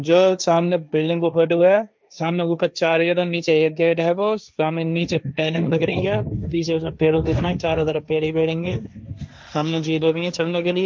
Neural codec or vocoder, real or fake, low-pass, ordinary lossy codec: codec, 16 kHz, 1.1 kbps, Voila-Tokenizer; fake; none; none